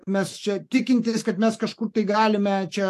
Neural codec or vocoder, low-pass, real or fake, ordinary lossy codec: autoencoder, 48 kHz, 128 numbers a frame, DAC-VAE, trained on Japanese speech; 14.4 kHz; fake; AAC, 64 kbps